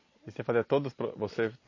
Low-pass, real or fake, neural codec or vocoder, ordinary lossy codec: 7.2 kHz; real; none; MP3, 32 kbps